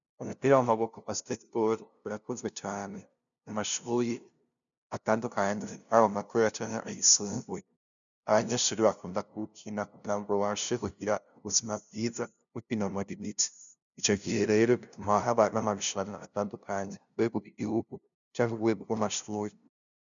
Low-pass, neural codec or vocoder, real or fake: 7.2 kHz; codec, 16 kHz, 0.5 kbps, FunCodec, trained on LibriTTS, 25 frames a second; fake